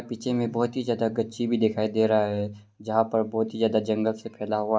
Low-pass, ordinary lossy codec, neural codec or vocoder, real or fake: none; none; none; real